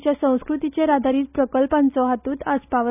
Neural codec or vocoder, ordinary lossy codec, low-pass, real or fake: none; none; 3.6 kHz; real